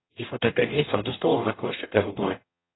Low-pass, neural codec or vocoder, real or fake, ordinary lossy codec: 7.2 kHz; codec, 44.1 kHz, 0.9 kbps, DAC; fake; AAC, 16 kbps